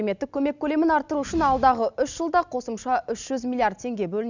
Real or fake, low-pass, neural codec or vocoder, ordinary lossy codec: real; 7.2 kHz; none; none